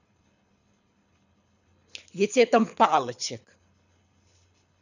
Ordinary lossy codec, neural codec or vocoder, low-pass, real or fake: none; codec, 24 kHz, 6 kbps, HILCodec; 7.2 kHz; fake